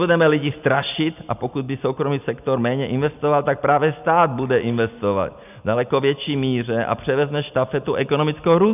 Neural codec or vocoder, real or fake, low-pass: none; real; 3.6 kHz